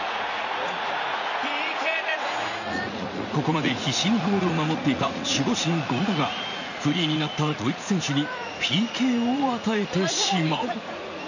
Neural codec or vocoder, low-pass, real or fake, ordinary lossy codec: vocoder, 44.1 kHz, 80 mel bands, Vocos; 7.2 kHz; fake; none